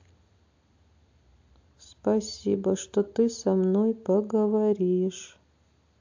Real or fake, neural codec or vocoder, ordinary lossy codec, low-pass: real; none; AAC, 48 kbps; 7.2 kHz